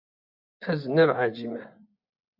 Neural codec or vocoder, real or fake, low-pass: vocoder, 24 kHz, 100 mel bands, Vocos; fake; 5.4 kHz